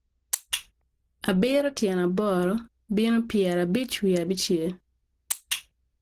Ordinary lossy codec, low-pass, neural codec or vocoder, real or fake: Opus, 16 kbps; 14.4 kHz; none; real